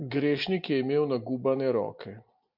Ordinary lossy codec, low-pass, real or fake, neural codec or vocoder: MP3, 48 kbps; 5.4 kHz; real; none